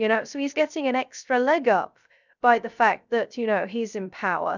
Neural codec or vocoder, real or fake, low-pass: codec, 16 kHz, 0.3 kbps, FocalCodec; fake; 7.2 kHz